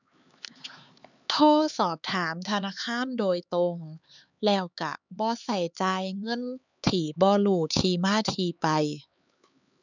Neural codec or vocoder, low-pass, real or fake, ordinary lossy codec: codec, 16 kHz, 4 kbps, X-Codec, HuBERT features, trained on LibriSpeech; 7.2 kHz; fake; none